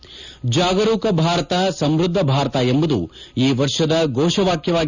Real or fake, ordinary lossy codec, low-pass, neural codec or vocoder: real; none; 7.2 kHz; none